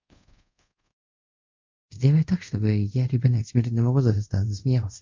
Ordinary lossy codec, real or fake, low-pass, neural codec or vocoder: MP3, 64 kbps; fake; 7.2 kHz; codec, 24 kHz, 0.5 kbps, DualCodec